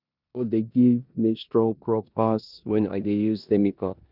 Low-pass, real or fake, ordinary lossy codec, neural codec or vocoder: 5.4 kHz; fake; none; codec, 16 kHz in and 24 kHz out, 0.9 kbps, LongCat-Audio-Codec, four codebook decoder